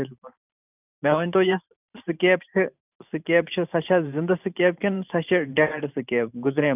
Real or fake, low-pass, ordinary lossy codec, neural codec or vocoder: fake; 3.6 kHz; none; vocoder, 44.1 kHz, 128 mel bands every 512 samples, BigVGAN v2